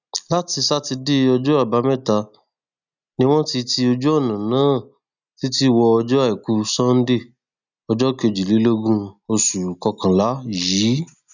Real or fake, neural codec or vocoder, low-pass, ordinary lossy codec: real; none; 7.2 kHz; none